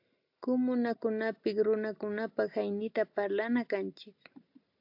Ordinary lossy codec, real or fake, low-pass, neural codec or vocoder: AAC, 48 kbps; real; 5.4 kHz; none